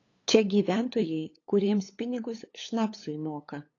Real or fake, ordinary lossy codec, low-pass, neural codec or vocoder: fake; AAC, 32 kbps; 7.2 kHz; codec, 16 kHz, 8 kbps, FunCodec, trained on LibriTTS, 25 frames a second